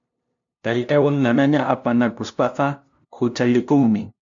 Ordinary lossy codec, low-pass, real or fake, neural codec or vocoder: MP3, 64 kbps; 7.2 kHz; fake; codec, 16 kHz, 0.5 kbps, FunCodec, trained on LibriTTS, 25 frames a second